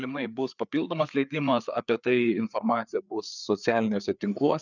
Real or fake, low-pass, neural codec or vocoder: fake; 7.2 kHz; codec, 16 kHz, 4 kbps, FreqCodec, larger model